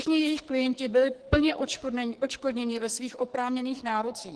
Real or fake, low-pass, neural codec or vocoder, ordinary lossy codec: fake; 10.8 kHz; codec, 44.1 kHz, 2.6 kbps, SNAC; Opus, 16 kbps